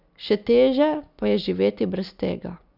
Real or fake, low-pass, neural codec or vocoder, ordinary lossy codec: real; 5.4 kHz; none; none